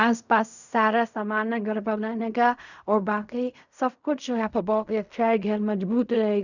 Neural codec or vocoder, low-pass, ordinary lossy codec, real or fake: codec, 16 kHz in and 24 kHz out, 0.4 kbps, LongCat-Audio-Codec, fine tuned four codebook decoder; 7.2 kHz; none; fake